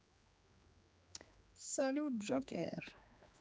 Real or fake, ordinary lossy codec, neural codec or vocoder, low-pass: fake; none; codec, 16 kHz, 2 kbps, X-Codec, HuBERT features, trained on general audio; none